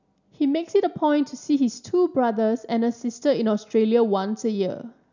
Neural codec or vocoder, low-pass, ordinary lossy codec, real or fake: none; 7.2 kHz; none; real